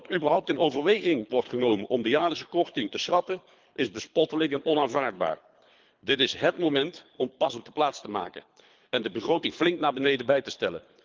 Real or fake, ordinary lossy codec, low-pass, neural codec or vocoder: fake; Opus, 24 kbps; 7.2 kHz; codec, 24 kHz, 3 kbps, HILCodec